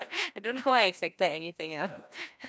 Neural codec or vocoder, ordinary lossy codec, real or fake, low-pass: codec, 16 kHz, 1 kbps, FreqCodec, larger model; none; fake; none